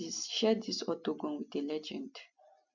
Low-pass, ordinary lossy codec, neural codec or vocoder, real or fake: 7.2 kHz; none; none; real